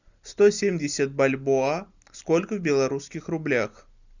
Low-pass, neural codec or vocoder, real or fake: 7.2 kHz; none; real